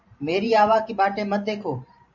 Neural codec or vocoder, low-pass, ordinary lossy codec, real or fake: vocoder, 44.1 kHz, 128 mel bands every 512 samples, BigVGAN v2; 7.2 kHz; MP3, 64 kbps; fake